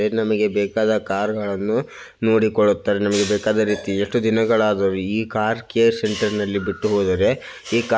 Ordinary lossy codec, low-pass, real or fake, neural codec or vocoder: none; none; real; none